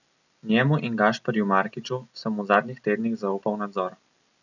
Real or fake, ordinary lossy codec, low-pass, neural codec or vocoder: real; none; 7.2 kHz; none